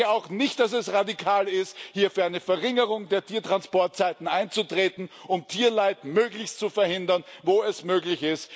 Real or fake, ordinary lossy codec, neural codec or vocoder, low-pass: real; none; none; none